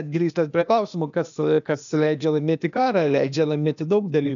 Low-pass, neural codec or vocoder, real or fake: 7.2 kHz; codec, 16 kHz, 0.8 kbps, ZipCodec; fake